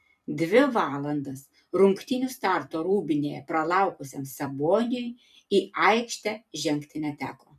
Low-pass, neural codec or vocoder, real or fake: 14.4 kHz; vocoder, 44.1 kHz, 128 mel bands every 256 samples, BigVGAN v2; fake